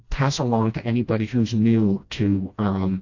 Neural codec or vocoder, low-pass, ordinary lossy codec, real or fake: codec, 16 kHz, 1 kbps, FreqCodec, smaller model; 7.2 kHz; AAC, 48 kbps; fake